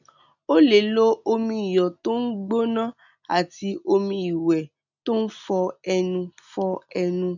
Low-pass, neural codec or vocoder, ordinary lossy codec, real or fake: 7.2 kHz; none; none; real